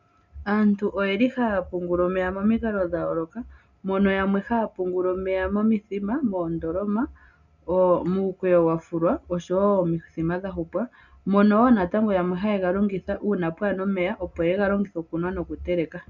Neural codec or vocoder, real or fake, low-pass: none; real; 7.2 kHz